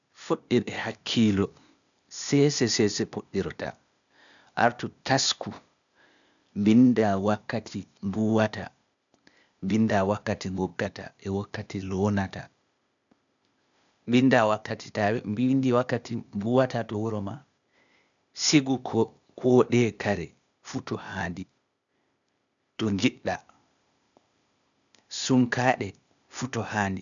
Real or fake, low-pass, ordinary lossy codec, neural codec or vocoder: fake; 7.2 kHz; none; codec, 16 kHz, 0.8 kbps, ZipCodec